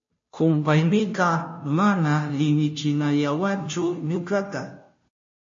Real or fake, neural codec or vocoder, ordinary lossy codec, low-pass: fake; codec, 16 kHz, 0.5 kbps, FunCodec, trained on Chinese and English, 25 frames a second; MP3, 32 kbps; 7.2 kHz